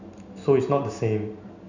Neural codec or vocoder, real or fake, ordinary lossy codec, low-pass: none; real; none; 7.2 kHz